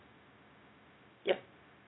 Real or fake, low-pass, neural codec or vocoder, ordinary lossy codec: fake; 7.2 kHz; codec, 16 kHz, 0.4 kbps, LongCat-Audio-Codec; AAC, 16 kbps